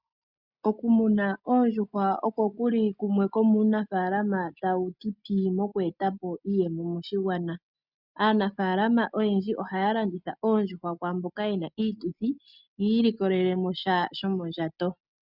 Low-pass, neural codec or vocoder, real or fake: 5.4 kHz; none; real